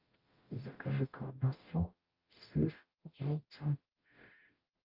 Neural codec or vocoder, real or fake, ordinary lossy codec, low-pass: codec, 44.1 kHz, 0.9 kbps, DAC; fake; Opus, 32 kbps; 5.4 kHz